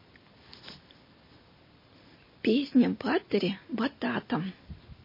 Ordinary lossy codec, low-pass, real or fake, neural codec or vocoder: MP3, 24 kbps; 5.4 kHz; real; none